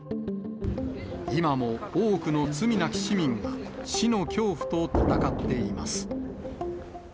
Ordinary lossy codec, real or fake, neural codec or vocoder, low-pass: none; real; none; none